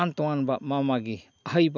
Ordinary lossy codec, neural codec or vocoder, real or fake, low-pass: none; none; real; 7.2 kHz